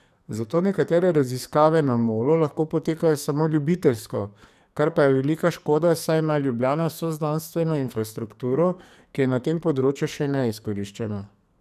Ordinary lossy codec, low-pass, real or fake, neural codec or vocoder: none; 14.4 kHz; fake; codec, 44.1 kHz, 2.6 kbps, SNAC